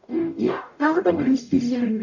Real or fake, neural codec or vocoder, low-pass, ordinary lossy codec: fake; codec, 44.1 kHz, 0.9 kbps, DAC; 7.2 kHz; none